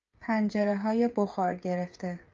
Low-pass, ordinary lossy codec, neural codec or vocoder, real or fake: 7.2 kHz; Opus, 24 kbps; codec, 16 kHz, 16 kbps, FreqCodec, smaller model; fake